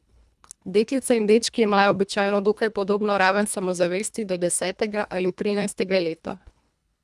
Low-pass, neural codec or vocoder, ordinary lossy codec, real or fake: none; codec, 24 kHz, 1.5 kbps, HILCodec; none; fake